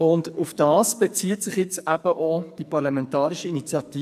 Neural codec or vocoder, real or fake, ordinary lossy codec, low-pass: codec, 44.1 kHz, 2.6 kbps, SNAC; fake; AAC, 96 kbps; 14.4 kHz